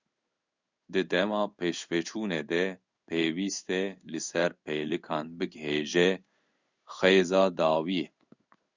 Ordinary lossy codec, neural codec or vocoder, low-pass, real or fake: Opus, 64 kbps; codec, 16 kHz in and 24 kHz out, 1 kbps, XY-Tokenizer; 7.2 kHz; fake